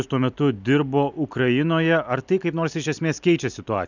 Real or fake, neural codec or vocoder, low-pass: real; none; 7.2 kHz